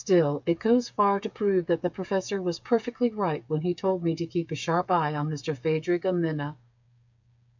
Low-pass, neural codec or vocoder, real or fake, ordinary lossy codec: 7.2 kHz; codec, 16 kHz, 8 kbps, FreqCodec, smaller model; fake; MP3, 64 kbps